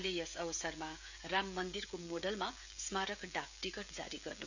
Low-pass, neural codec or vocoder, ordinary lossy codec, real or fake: 7.2 kHz; codec, 16 kHz, 16 kbps, FreqCodec, smaller model; none; fake